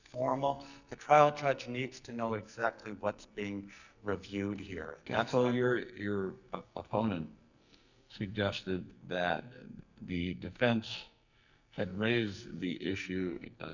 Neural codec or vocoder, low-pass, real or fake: codec, 44.1 kHz, 2.6 kbps, SNAC; 7.2 kHz; fake